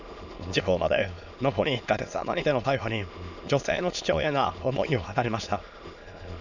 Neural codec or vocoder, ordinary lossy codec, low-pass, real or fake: autoencoder, 22.05 kHz, a latent of 192 numbers a frame, VITS, trained on many speakers; none; 7.2 kHz; fake